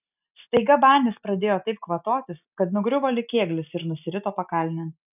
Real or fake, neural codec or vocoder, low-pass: real; none; 3.6 kHz